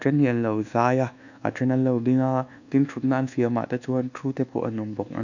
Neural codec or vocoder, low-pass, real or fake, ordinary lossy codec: codec, 24 kHz, 1.2 kbps, DualCodec; 7.2 kHz; fake; none